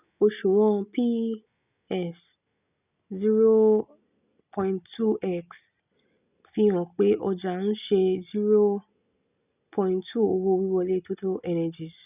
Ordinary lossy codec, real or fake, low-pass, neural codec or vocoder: none; real; 3.6 kHz; none